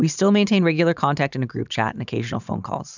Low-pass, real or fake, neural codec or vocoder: 7.2 kHz; fake; vocoder, 44.1 kHz, 128 mel bands every 512 samples, BigVGAN v2